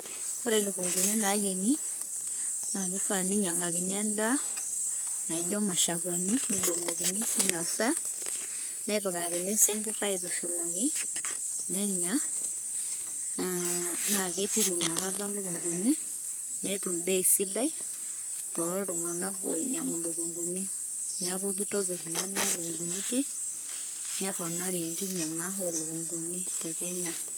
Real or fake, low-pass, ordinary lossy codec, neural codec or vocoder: fake; none; none; codec, 44.1 kHz, 3.4 kbps, Pupu-Codec